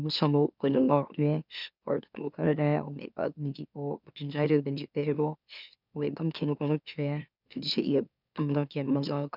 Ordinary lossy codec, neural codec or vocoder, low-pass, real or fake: none; autoencoder, 44.1 kHz, a latent of 192 numbers a frame, MeloTTS; 5.4 kHz; fake